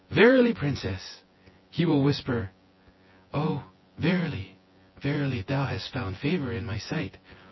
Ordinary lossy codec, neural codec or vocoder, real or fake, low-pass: MP3, 24 kbps; vocoder, 24 kHz, 100 mel bands, Vocos; fake; 7.2 kHz